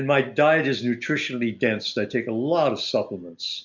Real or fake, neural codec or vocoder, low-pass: real; none; 7.2 kHz